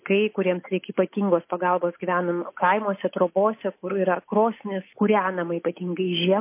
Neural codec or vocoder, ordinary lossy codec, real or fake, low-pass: none; MP3, 24 kbps; real; 3.6 kHz